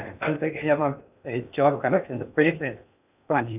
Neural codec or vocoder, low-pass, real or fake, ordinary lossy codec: codec, 16 kHz in and 24 kHz out, 0.6 kbps, FocalCodec, streaming, 2048 codes; 3.6 kHz; fake; none